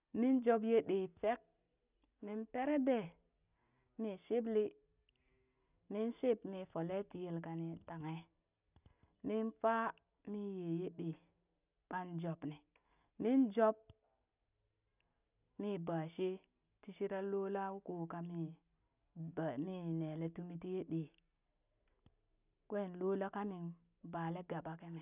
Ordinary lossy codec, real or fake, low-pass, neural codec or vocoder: none; real; 3.6 kHz; none